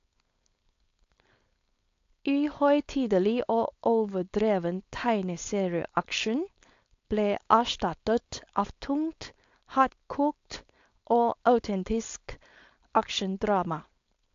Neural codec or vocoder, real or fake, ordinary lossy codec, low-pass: codec, 16 kHz, 4.8 kbps, FACodec; fake; AAC, 48 kbps; 7.2 kHz